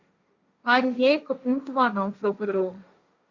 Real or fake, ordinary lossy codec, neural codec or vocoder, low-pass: fake; Opus, 64 kbps; codec, 16 kHz, 1.1 kbps, Voila-Tokenizer; 7.2 kHz